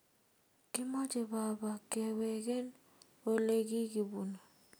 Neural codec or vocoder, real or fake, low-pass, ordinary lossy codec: none; real; none; none